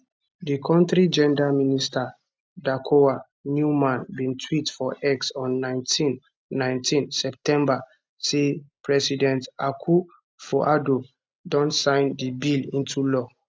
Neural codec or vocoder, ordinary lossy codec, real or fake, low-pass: none; none; real; none